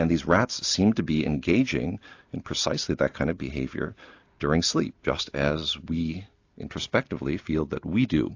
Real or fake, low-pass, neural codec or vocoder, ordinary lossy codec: real; 7.2 kHz; none; AAC, 48 kbps